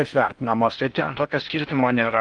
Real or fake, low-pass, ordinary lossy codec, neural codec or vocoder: fake; 9.9 kHz; Opus, 32 kbps; codec, 16 kHz in and 24 kHz out, 0.6 kbps, FocalCodec, streaming, 4096 codes